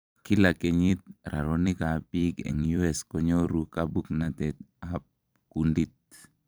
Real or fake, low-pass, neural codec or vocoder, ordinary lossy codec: fake; none; vocoder, 44.1 kHz, 128 mel bands every 256 samples, BigVGAN v2; none